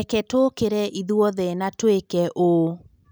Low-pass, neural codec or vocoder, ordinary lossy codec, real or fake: none; none; none; real